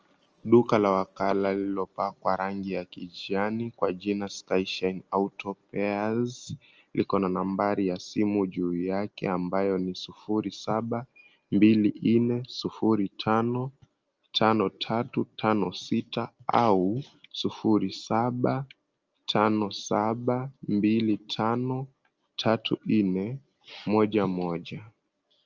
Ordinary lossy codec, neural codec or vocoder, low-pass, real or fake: Opus, 24 kbps; none; 7.2 kHz; real